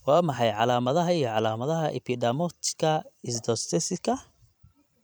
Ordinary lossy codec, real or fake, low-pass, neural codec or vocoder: none; fake; none; vocoder, 44.1 kHz, 128 mel bands every 256 samples, BigVGAN v2